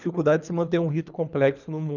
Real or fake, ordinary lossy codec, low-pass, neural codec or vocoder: fake; none; 7.2 kHz; codec, 24 kHz, 3 kbps, HILCodec